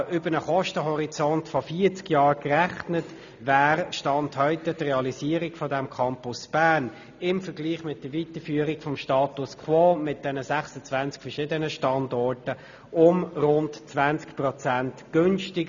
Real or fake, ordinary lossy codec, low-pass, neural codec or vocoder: real; none; 7.2 kHz; none